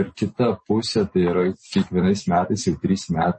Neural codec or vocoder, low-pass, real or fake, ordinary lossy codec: none; 10.8 kHz; real; MP3, 32 kbps